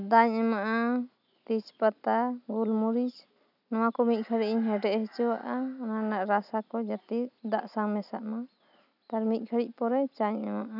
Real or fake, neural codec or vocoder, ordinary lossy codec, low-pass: real; none; none; 5.4 kHz